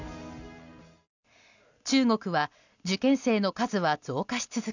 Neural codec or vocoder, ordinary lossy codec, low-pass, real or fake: none; none; 7.2 kHz; real